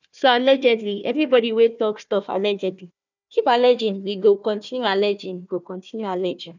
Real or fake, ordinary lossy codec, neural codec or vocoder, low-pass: fake; none; codec, 16 kHz, 1 kbps, FunCodec, trained on Chinese and English, 50 frames a second; 7.2 kHz